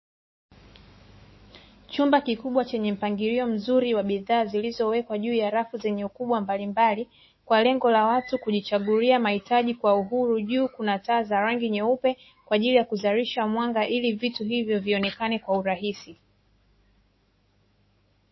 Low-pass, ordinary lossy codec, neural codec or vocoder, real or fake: 7.2 kHz; MP3, 24 kbps; autoencoder, 48 kHz, 128 numbers a frame, DAC-VAE, trained on Japanese speech; fake